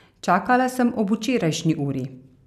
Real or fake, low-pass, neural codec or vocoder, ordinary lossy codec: real; 14.4 kHz; none; none